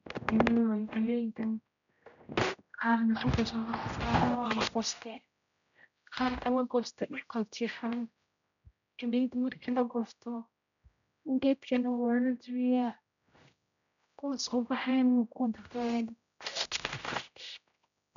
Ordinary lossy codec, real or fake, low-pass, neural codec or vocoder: none; fake; 7.2 kHz; codec, 16 kHz, 0.5 kbps, X-Codec, HuBERT features, trained on general audio